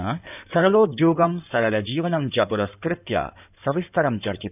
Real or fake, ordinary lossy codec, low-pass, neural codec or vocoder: fake; none; 3.6 kHz; codec, 16 kHz in and 24 kHz out, 2.2 kbps, FireRedTTS-2 codec